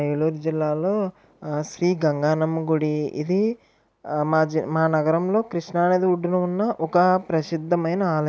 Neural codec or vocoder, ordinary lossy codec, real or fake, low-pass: none; none; real; none